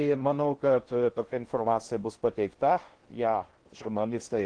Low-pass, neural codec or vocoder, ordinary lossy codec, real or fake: 9.9 kHz; codec, 16 kHz in and 24 kHz out, 0.8 kbps, FocalCodec, streaming, 65536 codes; Opus, 16 kbps; fake